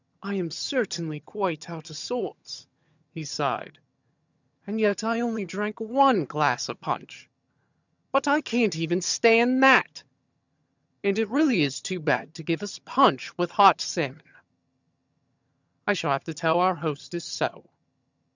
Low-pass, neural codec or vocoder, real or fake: 7.2 kHz; vocoder, 22.05 kHz, 80 mel bands, HiFi-GAN; fake